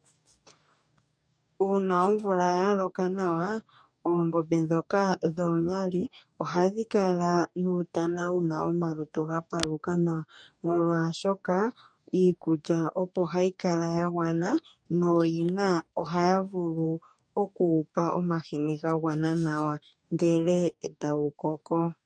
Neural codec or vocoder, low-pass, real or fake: codec, 44.1 kHz, 2.6 kbps, DAC; 9.9 kHz; fake